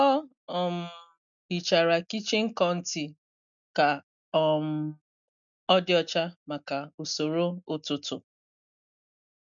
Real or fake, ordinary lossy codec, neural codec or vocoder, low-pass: real; MP3, 96 kbps; none; 7.2 kHz